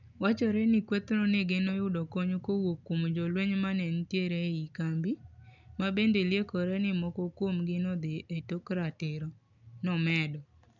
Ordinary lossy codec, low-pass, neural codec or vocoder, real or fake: none; 7.2 kHz; none; real